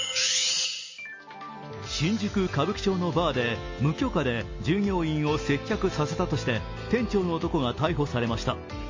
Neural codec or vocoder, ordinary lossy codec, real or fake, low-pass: none; MP3, 32 kbps; real; 7.2 kHz